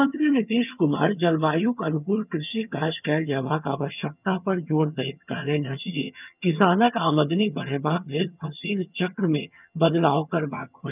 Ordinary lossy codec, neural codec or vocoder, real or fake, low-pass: none; vocoder, 22.05 kHz, 80 mel bands, HiFi-GAN; fake; 3.6 kHz